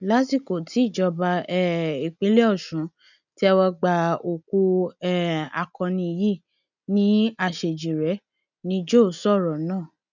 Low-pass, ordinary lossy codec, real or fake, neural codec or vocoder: 7.2 kHz; none; real; none